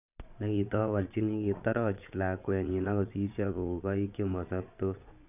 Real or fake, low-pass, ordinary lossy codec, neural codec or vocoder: fake; 3.6 kHz; none; vocoder, 22.05 kHz, 80 mel bands, Vocos